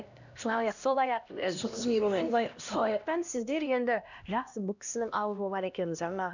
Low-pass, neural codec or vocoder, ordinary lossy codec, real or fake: 7.2 kHz; codec, 16 kHz, 1 kbps, X-Codec, HuBERT features, trained on LibriSpeech; none; fake